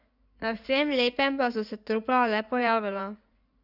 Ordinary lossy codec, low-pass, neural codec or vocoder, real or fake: none; 5.4 kHz; codec, 16 kHz in and 24 kHz out, 2.2 kbps, FireRedTTS-2 codec; fake